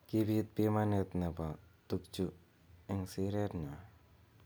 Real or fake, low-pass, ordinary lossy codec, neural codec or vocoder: real; none; none; none